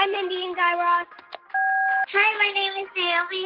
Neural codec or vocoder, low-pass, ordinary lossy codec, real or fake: none; 5.4 kHz; Opus, 16 kbps; real